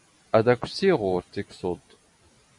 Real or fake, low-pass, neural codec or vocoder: real; 10.8 kHz; none